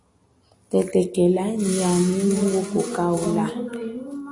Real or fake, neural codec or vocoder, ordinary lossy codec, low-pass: real; none; AAC, 32 kbps; 10.8 kHz